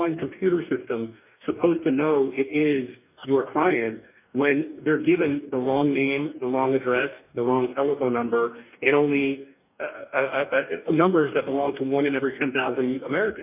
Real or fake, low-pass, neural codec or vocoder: fake; 3.6 kHz; codec, 44.1 kHz, 2.6 kbps, DAC